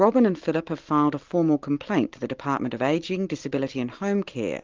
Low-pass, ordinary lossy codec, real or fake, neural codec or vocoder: 7.2 kHz; Opus, 32 kbps; real; none